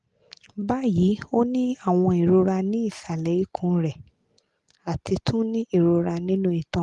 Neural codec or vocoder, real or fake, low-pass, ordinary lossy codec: none; real; 10.8 kHz; Opus, 24 kbps